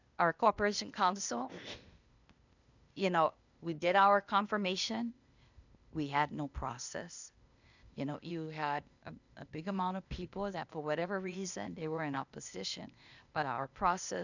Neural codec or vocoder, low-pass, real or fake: codec, 16 kHz, 0.8 kbps, ZipCodec; 7.2 kHz; fake